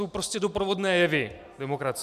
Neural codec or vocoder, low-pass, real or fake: none; 14.4 kHz; real